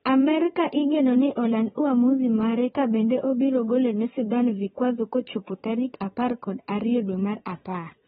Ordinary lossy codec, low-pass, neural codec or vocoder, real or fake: AAC, 16 kbps; 9.9 kHz; vocoder, 22.05 kHz, 80 mel bands, WaveNeXt; fake